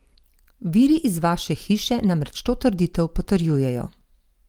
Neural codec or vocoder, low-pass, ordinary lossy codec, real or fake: vocoder, 44.1 kHz, 128 mel bands every 512 samples, BigVGAN v2; 19.8 kHz; Opus, 32 kbps; fake